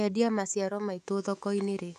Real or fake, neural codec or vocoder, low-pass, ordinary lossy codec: fake; autoencoder, 48 kHz, 128 numbers a frame, DAC-VAE, trained on Japanese speech; 14.4 kHz; none